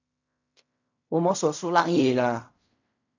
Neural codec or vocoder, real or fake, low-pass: codec, 16 kHz in and 24 kHz out, 0.4 kbps, LongCat-Audio-Codec, fine tuned four codebook decoder; fake; 7.2 kHz